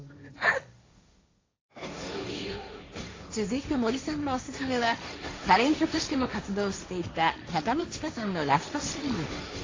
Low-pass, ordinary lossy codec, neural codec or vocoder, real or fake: 7.2 kHz; AAC, 32 kbps; codec, 16 kHz, 1.1 kbps, Voila-Tokenizer; fake